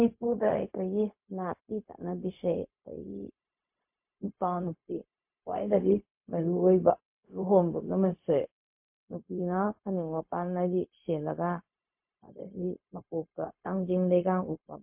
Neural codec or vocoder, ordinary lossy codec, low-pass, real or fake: codec, 16 kHz, 0.4 kbps, LongCat-Audio-Codec; MP3, 32 kbps; 3.6 kHz; fake